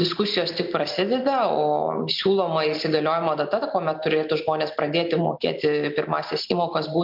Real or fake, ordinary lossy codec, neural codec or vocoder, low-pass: real; MP3, 48 kbps; none; 5.4 kHz